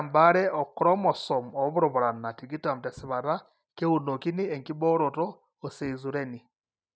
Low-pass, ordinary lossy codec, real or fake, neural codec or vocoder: none; none; real; none